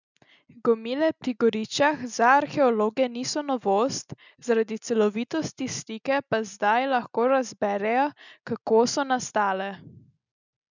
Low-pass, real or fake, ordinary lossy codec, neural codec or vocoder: 7.2 kHz; real; none; none